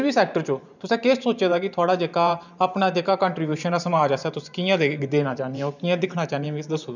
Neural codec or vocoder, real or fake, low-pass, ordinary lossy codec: vocoder, 44.1 kHz, 128 mel bands every 512 samples, BigVGAN v2; fake; 7.2 kHz; none